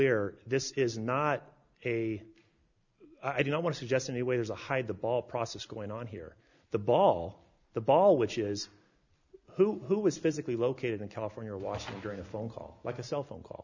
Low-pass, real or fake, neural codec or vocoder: 7.2 kHz; real; none